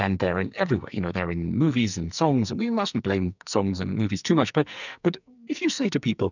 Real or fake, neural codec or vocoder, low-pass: fake; codec, 44.1 kHz, 2.6 kbps, SNAC; 7.2 kHz